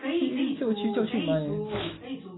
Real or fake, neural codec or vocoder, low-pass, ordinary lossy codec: real; none; 7.2 kHz; AAC, 16 kbps